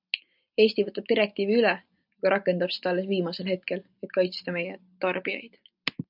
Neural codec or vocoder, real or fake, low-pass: none; real; 5.4 kHz